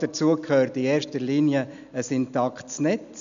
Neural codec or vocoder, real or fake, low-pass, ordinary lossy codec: none; real; 7.2 kHz; none